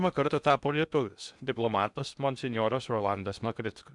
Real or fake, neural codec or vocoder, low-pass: fake; codec, 16 kHz in and 24 kHz out, 0.8 kbps, FocalCodec, streaming, 65536 codes; 10.8 kHz